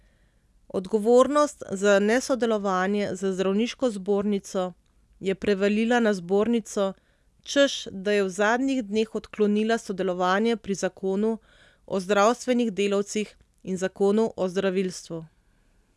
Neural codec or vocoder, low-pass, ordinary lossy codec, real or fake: none; none; none; real